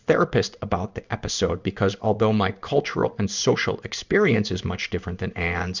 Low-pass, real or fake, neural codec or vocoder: 7.2 kHz; fake; codec, 16 kHz in and 24 kHz out, 1 kbps, XY-Tokenizer